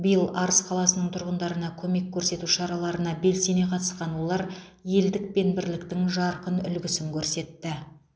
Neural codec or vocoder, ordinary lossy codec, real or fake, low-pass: none; none; real; none